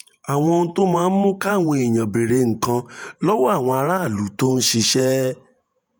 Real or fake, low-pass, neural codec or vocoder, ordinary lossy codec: fake; none; vocoder, 48 kHz, 128 mel bands, Vocos; none